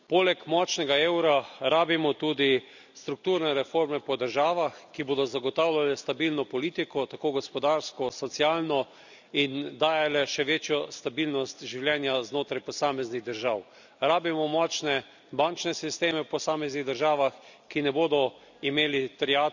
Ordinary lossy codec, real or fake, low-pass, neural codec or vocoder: none; real; 7.2 kHz; none